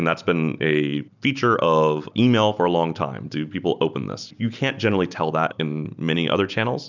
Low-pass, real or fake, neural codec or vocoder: 7.2 kHz; real; none